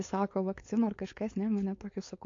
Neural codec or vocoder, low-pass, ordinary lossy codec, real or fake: codec, 16 kHz, 4.8 kbps, FACodec; 7.2 kHz; AAC, 48 kbps; fake